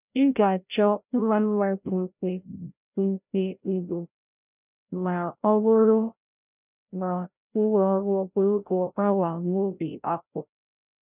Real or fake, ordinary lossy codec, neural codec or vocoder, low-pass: fake; none; codec, 16 kHz, 0.5 kbps, FreqCodec, larger model; 3.6 kHz